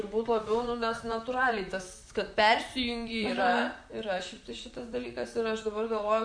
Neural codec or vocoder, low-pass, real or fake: vocoder, 44.1 kHz, 128 mel bands, Pupu-Vocoder; 9.9 kHz; fake